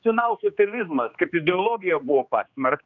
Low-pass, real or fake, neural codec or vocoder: 7.2 kHz; fake; codec, 16 kHz, 2 kbps, X-Codec, HuBERT features, trained on general audio